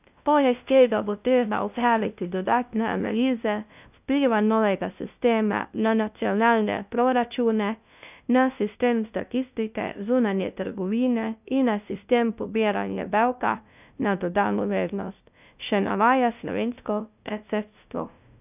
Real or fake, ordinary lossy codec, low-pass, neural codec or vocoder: fake; none; 3.6 kHz; codec, 16 kHz, 0.5 kbps, FunCodec, trained on LibriTTS, 25 frames a second